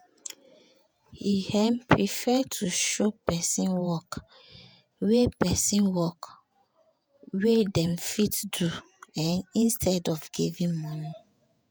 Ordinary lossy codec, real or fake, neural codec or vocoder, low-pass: none; fake; vocoder, 48 kHz, 128 mel bands, Vocos; none